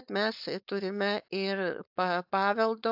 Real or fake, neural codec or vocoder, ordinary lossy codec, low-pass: fake; codec, 16 kHz, 4.8 kbps, FACodec; AAC, 48 kbps; 5.4 kHz